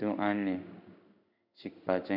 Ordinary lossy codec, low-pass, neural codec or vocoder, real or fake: none; 5.4 kHz; codec, 16 kHz in and 24 kHz out, 1 kbps, XY-Tokenizer; fake